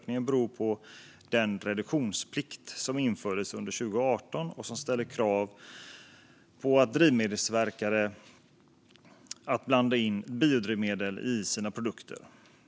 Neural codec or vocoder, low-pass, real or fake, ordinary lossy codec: none; none; real; none